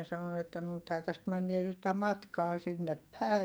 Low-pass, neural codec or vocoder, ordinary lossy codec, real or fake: none; codec, 44.1 kHz, 2.6 kbps, SNAC; none; fake